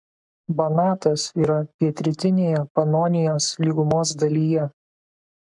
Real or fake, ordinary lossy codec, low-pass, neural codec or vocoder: fake; MP3, 96 kbps; 10.8 kHz; codec, 44.1 kHz, 7.8 kbps, Pupu-Codec